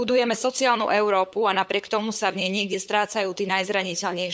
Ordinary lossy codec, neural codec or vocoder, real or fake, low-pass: none; codec, 16 kHz, 8 kbps, FunCodec, trained on LibriTTS, 25 frames a second; fake; none